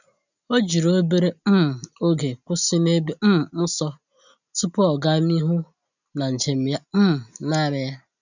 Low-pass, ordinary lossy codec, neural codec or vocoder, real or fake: 7.2 kHz; none; none; real